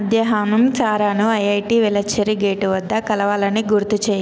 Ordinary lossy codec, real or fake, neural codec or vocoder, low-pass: none; real; none; none